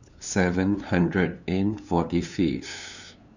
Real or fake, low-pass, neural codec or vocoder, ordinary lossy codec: fake; 7.2 kHz; codec, 16 kHz, 2 kbps, FunCodec, trained on LibriTTS, 25 frames a second; none